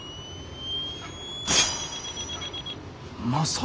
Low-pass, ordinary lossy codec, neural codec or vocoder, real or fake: none; none; none; real